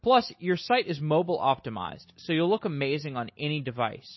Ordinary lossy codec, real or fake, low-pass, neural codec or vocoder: MP3, 24 kbps; real; 7.2 kHz; none